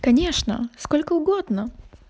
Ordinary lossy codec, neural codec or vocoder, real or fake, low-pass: none; none; real; none